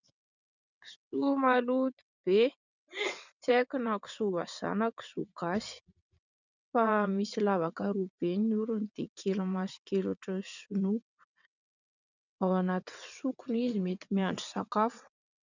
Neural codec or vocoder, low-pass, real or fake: vocoder, 22.05 kHz, 80 mel bands, WaveNeXt; 7.2 kHz; fake